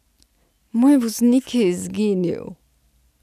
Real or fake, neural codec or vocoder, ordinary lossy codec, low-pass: real; none; none; 14.4 kHz